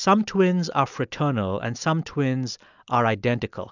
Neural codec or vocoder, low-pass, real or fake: none; 7.2 kHz; real